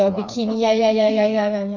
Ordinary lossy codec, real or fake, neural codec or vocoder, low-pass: none; fake; codec, 16 kHz, 4 kbps, FreqCodec, smaller model; 7.2 kHz